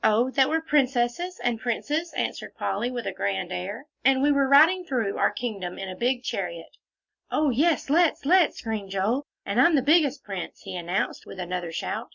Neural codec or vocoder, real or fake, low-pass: none; real; 7.2 kHz